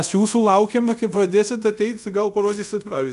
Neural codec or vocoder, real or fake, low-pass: codec, 24 kHz, 0.5 kbps, DualCodec; fake; 10.8 kHz